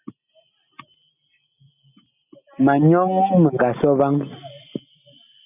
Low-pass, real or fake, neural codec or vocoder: 3.6 kHz; real; none